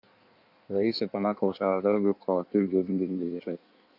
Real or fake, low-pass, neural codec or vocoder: fake; 5.4 kHz; codec, 16 kHz in and 24 kHz out, 1.1 kbps, FireRedTTS-2 codec